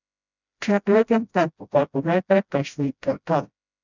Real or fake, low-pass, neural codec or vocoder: fake; 7.2 kHz; codec, 16 kHz, 0.5 kbps, FreqCodec, smaller model